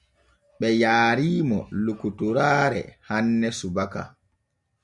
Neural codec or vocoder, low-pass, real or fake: none; 10.8 kHz; real